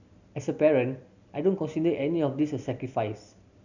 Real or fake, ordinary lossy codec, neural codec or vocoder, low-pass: real; none; none; 7.2 kHz